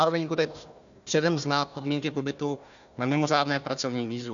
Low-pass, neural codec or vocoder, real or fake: 7.2 kHz; codec, 16 kHz, 1 kbps, FunCodec, trained on Chinese and English, 50 frames a second; fake